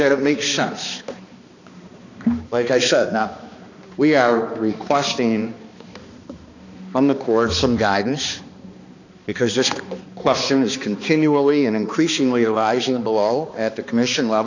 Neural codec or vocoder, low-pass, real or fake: codec, 16 kHz, 2 kbps, X-Codec, HuBERT features, trained on balanced general audio; 7.2 kHz; fake